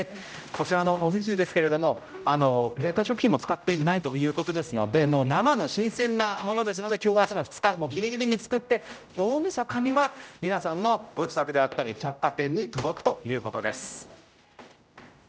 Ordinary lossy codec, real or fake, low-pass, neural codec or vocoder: none; fake; none; codec, 16 kHz, 0.5 kbps, X-Codec, HuBERT features, trained on general audio